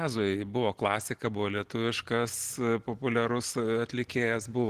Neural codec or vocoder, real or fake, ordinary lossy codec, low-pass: none; real; Opus, 16 kbps; 14.4 kHz